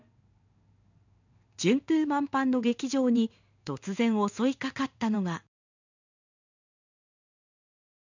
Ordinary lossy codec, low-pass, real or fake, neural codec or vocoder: none; 7.2 kHz; fake; codec, 16 kHz in and 24 kHz out, 1 kbps, XY-Tokenizer